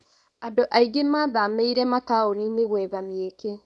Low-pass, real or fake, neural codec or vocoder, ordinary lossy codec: none; fake; codec, 24 kHz, 0.9 kbps, WavTokenizer, small release; none